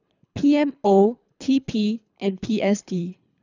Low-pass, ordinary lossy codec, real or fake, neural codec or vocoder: 7.2 kHz; none; fake; codec, 24 kHz, 3 kbps, HILCodec